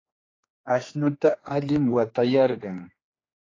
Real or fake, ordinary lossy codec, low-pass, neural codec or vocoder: fake; AAC, 32 kbps; 7.2 kHz; codec, 16 kHz, 2 kbps, X-Codec, HuBERT features, trained on general audio